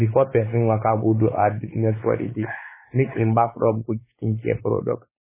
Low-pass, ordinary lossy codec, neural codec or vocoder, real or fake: 3.6 kHz; MP3, 16 kbps; codec, 16 kHz, 4 kbps, X-Codec, WavLM features, trained on Multilingual LibriSpeech; fake